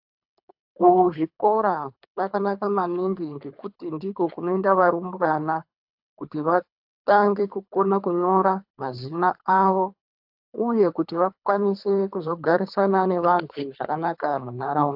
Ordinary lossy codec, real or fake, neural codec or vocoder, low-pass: AAC, 48 kbps; fake; codec, 24 kHz, 3 kbps, HILCodec; 5.4 kHz